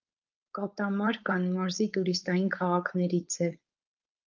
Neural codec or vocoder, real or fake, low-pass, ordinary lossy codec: codec, 16 kHz, 4.8 kbps, FACodec; fake; 7.2 kHz; Opus, 24 kbps